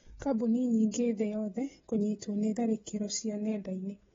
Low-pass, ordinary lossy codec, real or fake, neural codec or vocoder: 7.2 kHz; AAC, 24 kbps; fake; codec, 16 kHz, 16 kbps, FreqCodec, smaller model